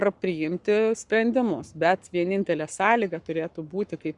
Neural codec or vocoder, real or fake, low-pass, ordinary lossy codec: codec, 44.1 kHz, 7.8 kbps, Pupu-Codec; fake; 10.8 kHz; Opus, 64 kbps